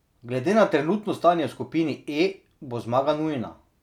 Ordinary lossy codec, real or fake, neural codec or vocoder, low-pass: none; real; none; 19.8 kHz